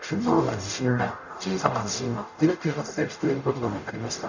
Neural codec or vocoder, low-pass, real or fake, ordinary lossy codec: codec, 44.1 kHz, 0.9 kbps, DAC; 7.2 kHz; fake; none